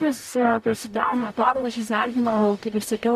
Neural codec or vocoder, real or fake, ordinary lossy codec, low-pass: codec, 44.1 kHz, 0.9 kbps, DAC; fake; MP3, 96 kbps; 14.4 kHz